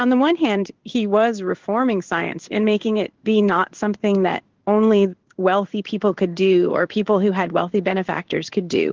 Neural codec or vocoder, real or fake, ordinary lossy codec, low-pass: codec, 16 kHz in and 24 kHz out, 1 kbps, XY-Tokenizer; fake; Opus, 16 kbps; 7.2 kHz